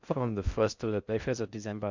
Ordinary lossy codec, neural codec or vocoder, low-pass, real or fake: Opus, 64 kbps; codec, 16 kHz in and 24 kHz out, 0.6 kbps, FocalCodec, streaming, 4096 codes; 7.2 kHz; fake